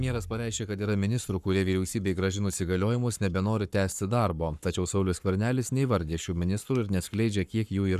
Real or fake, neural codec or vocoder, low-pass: fake; codec, 44.1 kHz, 7.8 kbps, Pupu-Codec; 14.4 kHz